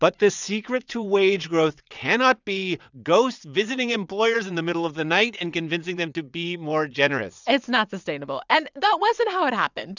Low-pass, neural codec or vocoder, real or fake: 7.2 kHz; vocoder, 22.05 kHz, 80 mel bands, WaveNeXt; fake